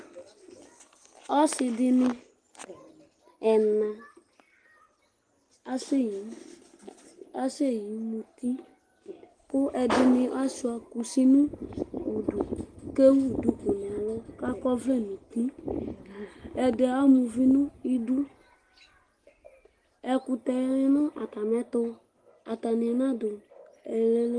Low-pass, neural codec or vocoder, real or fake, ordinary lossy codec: 9.9 kHz; none; real; Opus, 24 kbps